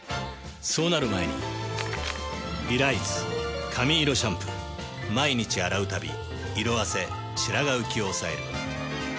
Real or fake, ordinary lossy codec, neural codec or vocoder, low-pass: real; none; none; none